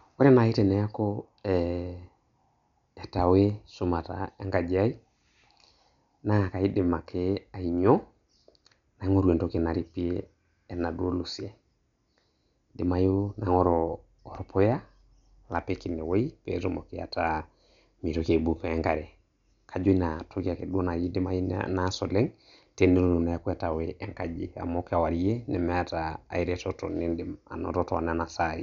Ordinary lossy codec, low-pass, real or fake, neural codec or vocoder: none; 7.2 kHz; real; none